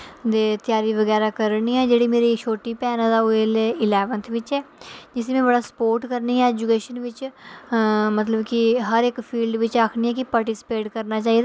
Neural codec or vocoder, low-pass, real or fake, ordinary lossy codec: none; none; real; none